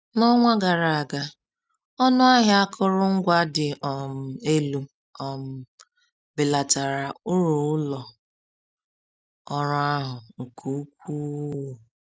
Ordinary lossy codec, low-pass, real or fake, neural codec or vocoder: none; none; real; none